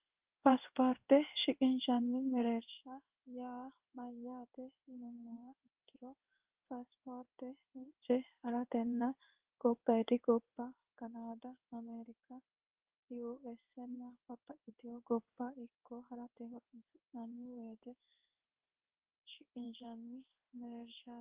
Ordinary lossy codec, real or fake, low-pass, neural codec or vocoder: Opus, 32 kbps; fake; 3.6 kHz; codec, 16 kHz in and 24 kHz out, 1 kbps, XY-Tokenizer